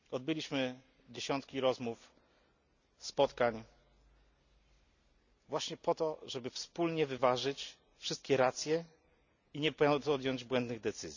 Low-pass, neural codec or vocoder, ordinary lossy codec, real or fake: 7.2 kHz; none; none; real